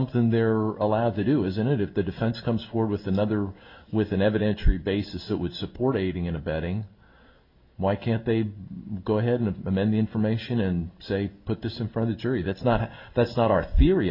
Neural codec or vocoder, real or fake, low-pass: none; real; 5.4 kHz